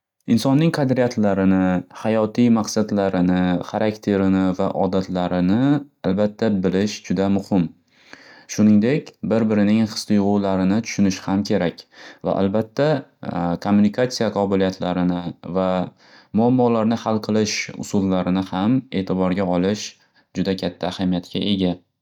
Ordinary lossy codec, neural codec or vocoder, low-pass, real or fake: none; none; 19.8 kHz; real